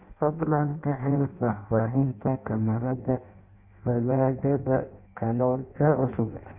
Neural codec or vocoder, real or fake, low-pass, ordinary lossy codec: codec, 16 kHz in and 24 kHz out, 0.6 kbps, FireRedTTS-2 codec; fake; 3.6 kHz; AAC, 32 kbps